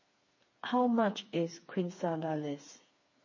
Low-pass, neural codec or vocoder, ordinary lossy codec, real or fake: 7.2 kHz; codec, 16 kHz, 4 kbps, FreqCodec, smaller model; MP3, 32 kbps; fake